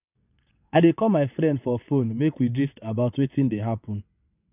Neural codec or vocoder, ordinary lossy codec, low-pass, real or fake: vocoder, 22.05 kHz, 80 mel bands, WaveNeXt; none; 3.6 kHz; fake